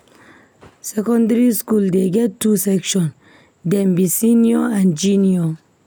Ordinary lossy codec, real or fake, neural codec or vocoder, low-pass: none; real; none; none